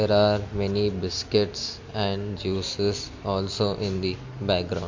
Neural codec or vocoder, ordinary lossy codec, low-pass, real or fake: none; MP3, 48 kbps; 7.2 kHz; real